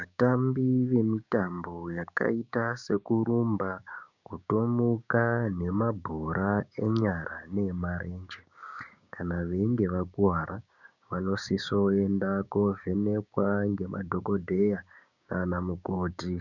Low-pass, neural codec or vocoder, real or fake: 7.2 kHz; codec, 16 kHz, 6 kbps, DAC; fake